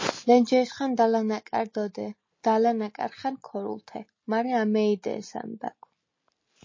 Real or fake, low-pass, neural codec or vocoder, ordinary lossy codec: real; 7.2 kHz; none; MP3, 32 kbps